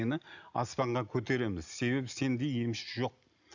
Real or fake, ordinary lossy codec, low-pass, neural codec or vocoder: real; none; 7.2 kHz; none